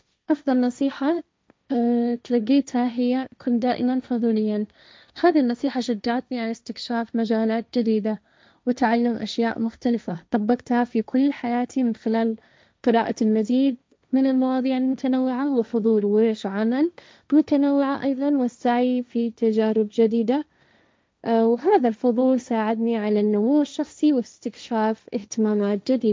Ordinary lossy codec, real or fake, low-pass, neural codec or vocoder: none; fake; none; codec, 16 kHz, 1.1 kbps, Voila-Tokenizer